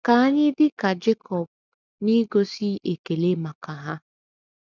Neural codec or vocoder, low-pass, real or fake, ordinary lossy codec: none; 7.2 kHz; real; none